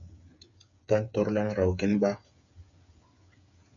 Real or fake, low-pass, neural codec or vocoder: fake; 7.2 kHz; codec, 16 kHz, 8 kbps, FreqCodec, smaller model